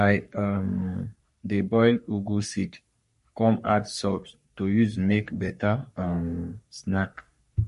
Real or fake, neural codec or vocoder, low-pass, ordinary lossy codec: fake; codec, 44.1 kHz, 3.4 kbps, Pupu-Codec; 14.4 kHz; MP3, 48 kbps